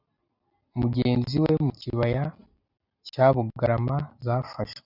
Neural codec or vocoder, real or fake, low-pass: none; real; 5.4 kHz